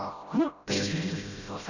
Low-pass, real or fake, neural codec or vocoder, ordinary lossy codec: 7.2 kHz; fake; codec, 16 kHz, 0.5 kbps, FreqCodec, smaller model; none